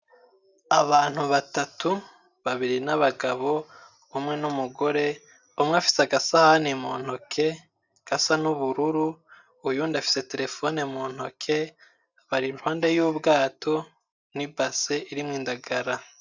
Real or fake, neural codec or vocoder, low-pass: real; none; 7.2 kHz